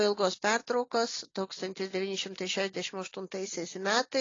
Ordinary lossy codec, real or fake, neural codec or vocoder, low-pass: AAC, 32 kbps; real; none; 7.2 kHz